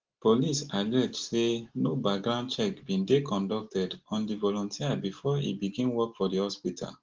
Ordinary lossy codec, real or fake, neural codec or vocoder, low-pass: Opus, 16 kbps; real; none; 7.2 kHz